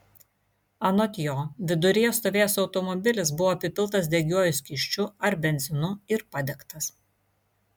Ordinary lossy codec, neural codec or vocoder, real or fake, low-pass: MP3, 96 kbps; none; real; 19.8 kHz